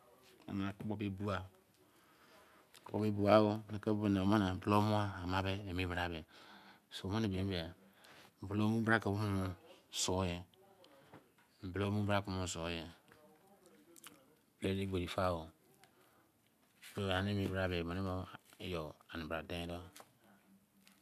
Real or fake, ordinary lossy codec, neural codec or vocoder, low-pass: real; none; none; 14.4 kHz